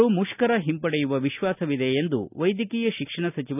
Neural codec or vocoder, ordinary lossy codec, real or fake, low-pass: none; none; real; 3.6 kHz